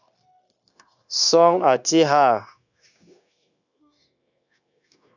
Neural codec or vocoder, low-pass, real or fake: codec, 16 kHz, 0.9 kbps, LongCat-Audio-Codec; 7.2 kHz; fake